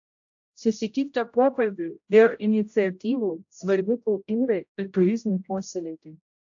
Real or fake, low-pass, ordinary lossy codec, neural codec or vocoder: fake; 7.2 kHz; AAC, 64 kbps; codec, 16 kHz, 0.5 kbps, X-Codec, HuBERT features, trained on general audio